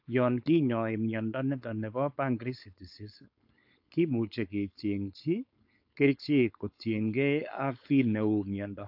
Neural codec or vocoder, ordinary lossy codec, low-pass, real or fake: codec, 16 kHz, 4.8 kbps, FACodec; AAC, 48 kbps; 5.4 kHz; fake